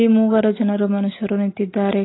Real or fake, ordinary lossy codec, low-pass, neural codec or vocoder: real; AAC, 16 kbps; 7.2 kHz; none